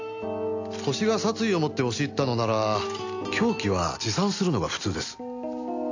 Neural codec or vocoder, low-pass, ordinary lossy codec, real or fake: none; 7.2 kHz; AAC, 48 kbps; real